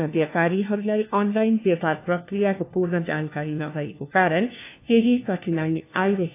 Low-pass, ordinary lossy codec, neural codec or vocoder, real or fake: 3.6 kHz; AAC, 24 kbps; codec, 16 kHz, 1 kbps, FunCodec, trained on LibriTTS, 50 frames a second; fake